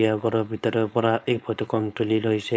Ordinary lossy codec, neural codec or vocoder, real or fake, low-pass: none; codec, 16 kHz, 4.8 kbps, FACodec; fake; none